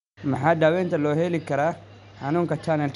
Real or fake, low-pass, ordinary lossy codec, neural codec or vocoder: real; 10.8 kHz; none; none